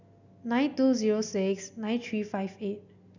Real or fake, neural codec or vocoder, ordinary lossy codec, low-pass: real; none; none; 7.2 kHz